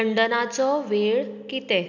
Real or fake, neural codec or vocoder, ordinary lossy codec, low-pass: real; none; none; 7.2 kHz